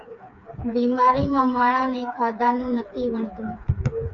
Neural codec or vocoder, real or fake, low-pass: codec, 16 kHz, 4 kbps, FreqCodec, smaller model; fake; 7.2 kHz